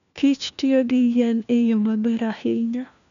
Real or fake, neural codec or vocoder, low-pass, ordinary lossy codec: fake; codec, 16 kHz, 1 kbps, FunCodec, trained on LibriTTS, 50 frames a second; 7.2 kHz; none